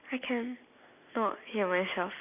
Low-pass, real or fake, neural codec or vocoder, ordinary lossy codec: 3.6 kHz; real; none; none